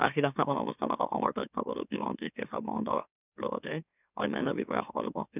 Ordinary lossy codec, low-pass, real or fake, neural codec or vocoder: none; 3.6 kHz; fake; autoencoder, 44.1 kHz, a latent of 192 numbers a frame, MeloTTS